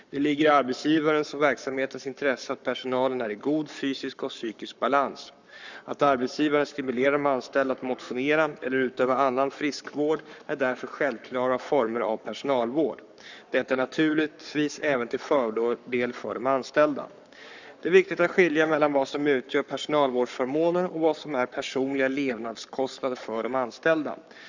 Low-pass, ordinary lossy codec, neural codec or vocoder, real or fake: 7.2 kHz; none; codec, 44.1 kHz, 7.8 kbps, Pupu-Codec; fake